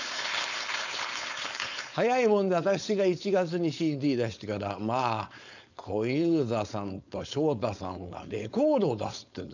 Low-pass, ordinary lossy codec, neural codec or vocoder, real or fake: 7.2 kHz; none; codec, 16 kHz, 4.8 kbps, FACodec; fake